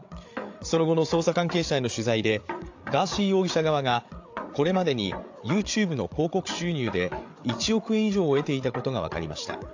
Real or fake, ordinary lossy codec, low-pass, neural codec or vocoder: fake; AAC, 48 kbps; 7.2 kHz; codec, 16 kHz, 8 kbps, FreqCodec, larger model